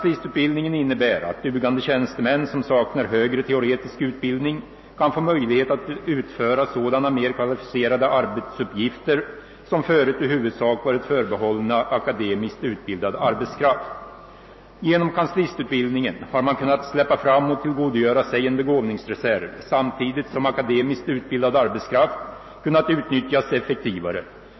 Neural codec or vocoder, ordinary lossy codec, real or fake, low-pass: none; MP3, 24 kbps; real; 7.2 kHz